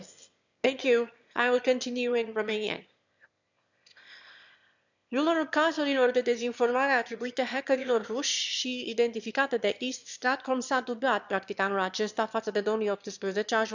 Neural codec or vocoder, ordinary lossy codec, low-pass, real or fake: autoencoder, 22.05 kHz, a latent of 192 numbers a frame, VITS, trained on one speaker; none; 7.2 kHz; fake